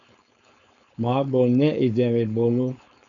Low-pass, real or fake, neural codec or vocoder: 7.2 kHz; fake; codec, 16 kHz, 4.8 kbps, FACodec